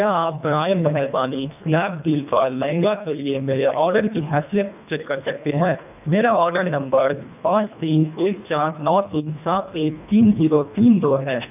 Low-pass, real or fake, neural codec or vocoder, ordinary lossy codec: 3.6 kHz; fake; codec, 24 kHz, 1.5 kbps, HILCodec; none